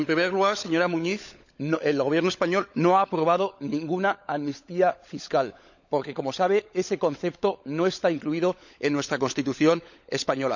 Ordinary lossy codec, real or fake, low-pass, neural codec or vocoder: none; fake; 7.2 kHz; codec, 16 kHz, 16 kbps, FunCodec, trained on LibriTTS, 50 frames a second